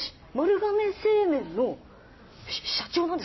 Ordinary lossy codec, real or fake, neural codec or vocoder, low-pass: MP3, 24 kbps; real; none; 7.2 kHz